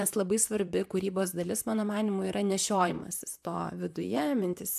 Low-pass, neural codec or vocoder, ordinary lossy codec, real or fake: 14.4 kHz; vocoder, 44.1 kHz, 128 mel bands, Pupu-Vocoder; AAC, 96 kbps; fake